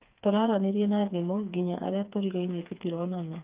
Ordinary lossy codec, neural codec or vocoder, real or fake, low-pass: Opus, 24 kbps; codec, 16 kHz, 4 kbps, FreqCodec, smaller model; fake; 3.6 kHz